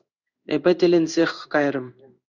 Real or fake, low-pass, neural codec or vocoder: fake; 7.2 kHz; codec, 16 kHz in and 24 kHz out, 1 kbps, XY-Tokenizer